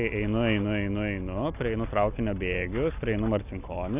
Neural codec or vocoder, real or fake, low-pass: none; real; 3.6 kHz